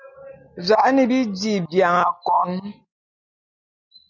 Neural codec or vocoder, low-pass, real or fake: none; 7.2 kHz; real